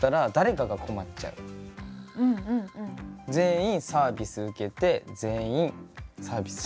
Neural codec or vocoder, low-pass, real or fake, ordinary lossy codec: none; none; real; none